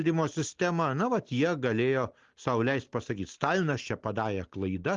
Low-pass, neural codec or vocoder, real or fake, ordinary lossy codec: 7.2 kHz; none; real; Opus, 24 kbps